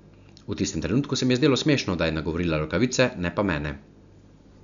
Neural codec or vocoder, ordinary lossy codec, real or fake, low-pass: none; none; real; 7.2 kHz